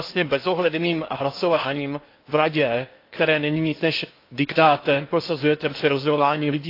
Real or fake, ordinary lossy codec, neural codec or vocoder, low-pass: fake; AAC, 32 kbps; codec, 16 kHz in and 24 kHz out, 0.8 kbps, FocalCodec, streaming, 65536 codes; 5.4 kHz